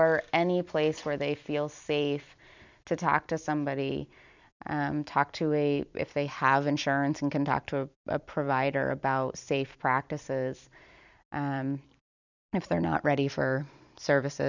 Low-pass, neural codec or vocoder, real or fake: 7.2 kHz; vocoder, 44.1 kHz, 128 mel bands every 256 samples, BigVGAN v2; fake